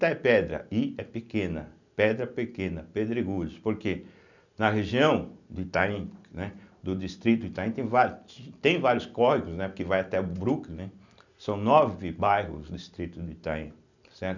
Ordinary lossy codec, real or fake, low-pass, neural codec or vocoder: none; real; 7.2 kHz; none